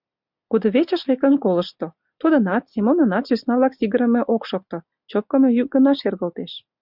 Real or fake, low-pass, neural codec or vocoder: real; 5.4 kHz; none